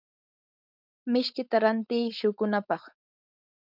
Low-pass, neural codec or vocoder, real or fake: 5.4 kHz; codec, 16 kHz, 4.8 kbps, FACodec; fake